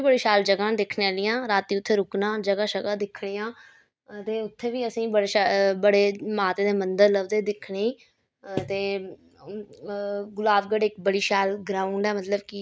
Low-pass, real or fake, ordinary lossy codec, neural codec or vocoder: none; real; none; none